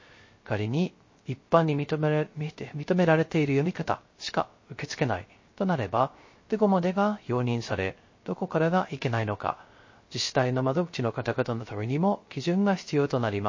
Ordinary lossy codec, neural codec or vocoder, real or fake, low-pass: MP3, 32 kbps; codec, 16 kHz, 0.3 kbps, FocalCodec; fake; 7.2 kHz